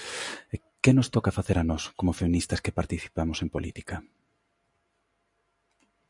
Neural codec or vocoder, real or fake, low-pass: none; real; 10.8 kHz